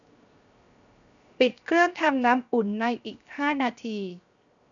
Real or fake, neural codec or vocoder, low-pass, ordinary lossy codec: fake; codec, 16 kHz, 0.7 kbps, FocalCodec; 7.2 kHz; none